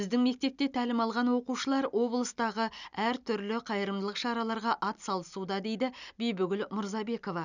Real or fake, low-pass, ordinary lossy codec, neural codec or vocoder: real; 7.2 kHz; none; none